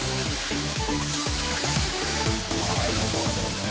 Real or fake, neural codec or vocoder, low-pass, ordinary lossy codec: fake; codec, 16 kHz, 4 kbps, X-Codec, HuBERT features, trained on general audio; none; none